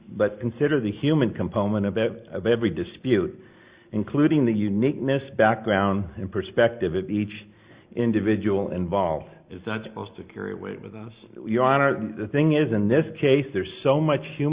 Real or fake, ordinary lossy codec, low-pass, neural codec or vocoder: real; Opus, 64 kbps; 3.6 kHz; none